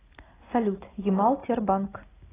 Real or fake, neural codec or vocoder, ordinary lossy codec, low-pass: real; none; AAC, 16 kbps; 3.6 kHz